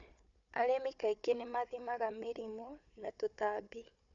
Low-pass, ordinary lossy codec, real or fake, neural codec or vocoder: 7.2 kHz; none; fake; codec, 16 kHz, 4 kbps, FunCodec, trained on Chinese and English, 50 frames a second